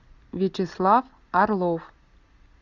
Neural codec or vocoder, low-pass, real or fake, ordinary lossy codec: none; 7.2 kHz; real; Opus, 64 kbps